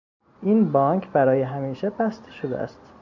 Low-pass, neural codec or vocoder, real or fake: 7.2 kHz; none; real